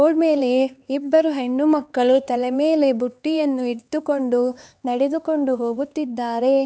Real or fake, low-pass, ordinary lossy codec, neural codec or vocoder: fake; none; none; codec, 16 kHz, 4 kbps, X-Codec, HuBERT features, trained on LibriSpeech